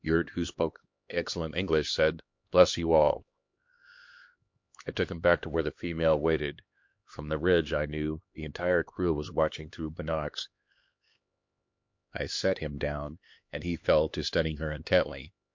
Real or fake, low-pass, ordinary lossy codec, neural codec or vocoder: fake; 7.2 kHz; MP3, 48 kbps; codec, 16 kHz, 2 kbps, X-Codec, HuBERT features, trained on LibriSpeech